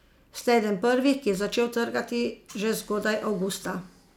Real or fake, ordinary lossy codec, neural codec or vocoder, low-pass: real; none; none; 19.8 kHz